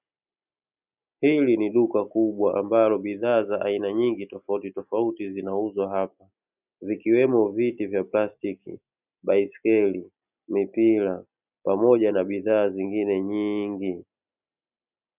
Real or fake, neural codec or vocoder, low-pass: real; none; 3.6 kHz